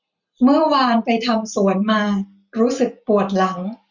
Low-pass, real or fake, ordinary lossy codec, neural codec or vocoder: 7.2 kHz; real; none; none